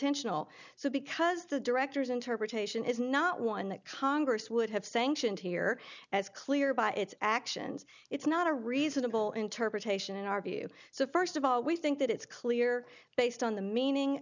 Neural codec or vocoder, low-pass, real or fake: none; 7.2 kHz; real